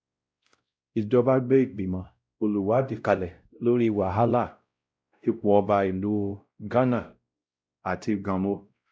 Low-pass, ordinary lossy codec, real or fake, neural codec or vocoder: none; none; fake; codec, 16 kHz, 0.5 kbps, X-Codec, WavLM features, trained on Multilingual LibriSpeech